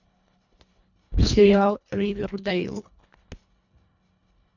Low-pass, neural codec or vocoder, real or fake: 7.2 kHz; codec, 24 kHz, 1.5 kbps, HILCodec; fake